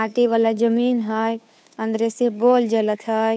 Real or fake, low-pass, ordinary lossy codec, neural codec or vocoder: fake; none; none; codec, 16 kHz, 2 kbps, FunCodec, trained on Chinese and English, 25 frames a second